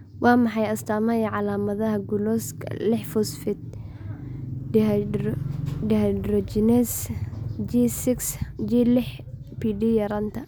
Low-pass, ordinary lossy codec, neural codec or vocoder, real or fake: none; none; none; real